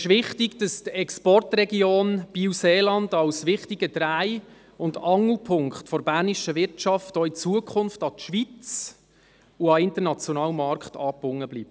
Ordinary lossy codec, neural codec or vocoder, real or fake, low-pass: none; none; real; none